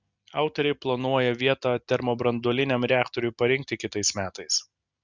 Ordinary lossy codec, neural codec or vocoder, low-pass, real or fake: Opus, 64 kbps; none; 7.2 kHz; real